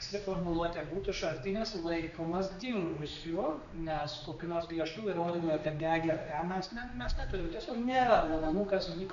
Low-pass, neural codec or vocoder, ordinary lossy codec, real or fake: 7.2 kHz; codec, 16 kHz, 2 kbps, X-Codec, HuBERT features, trained on general audio; MP3, 96 kbps; fake